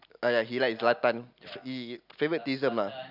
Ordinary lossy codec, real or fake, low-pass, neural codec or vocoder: none; real; 5.4 kHz; none